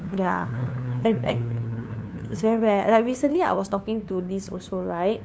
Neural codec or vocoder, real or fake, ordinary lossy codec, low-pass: codec, 16 kHz, 2 kbps, FunCodec, trained on LibriTTS, 25 frames a second; fake; none; none